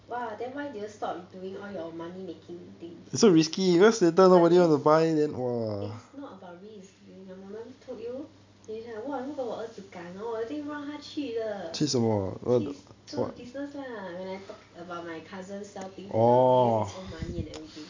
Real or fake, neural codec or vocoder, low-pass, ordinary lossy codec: real; none; 7.2 kHz; MP3, 64 kbps